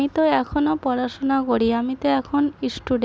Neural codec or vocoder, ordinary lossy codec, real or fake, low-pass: none; none; real; none